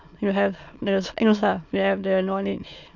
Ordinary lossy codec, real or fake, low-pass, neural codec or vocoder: none; fake; 7.2 kHz; autoencoder, 22.05 kHz, a latent of 192 numbers a frame, VITS, trained on many speakers